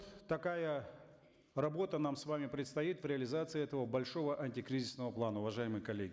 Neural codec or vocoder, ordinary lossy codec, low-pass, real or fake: none; none; none; real